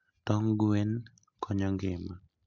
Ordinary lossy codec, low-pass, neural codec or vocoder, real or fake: none; 7.2 kHz; none; real